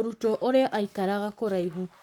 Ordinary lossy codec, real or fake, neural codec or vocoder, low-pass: none; fake; codec, 44.1 kHz, 7.8 kbps, Pupu-Codec; 19.8 kHz